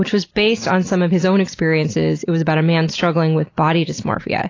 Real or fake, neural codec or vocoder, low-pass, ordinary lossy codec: real; none; 7.2 kHz; AAC, 32 kbps